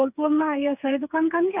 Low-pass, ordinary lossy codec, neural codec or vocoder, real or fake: 3.6 kHz; none; codec, 16 kHz, 4 kbps, FreqCodec, smaller model; fake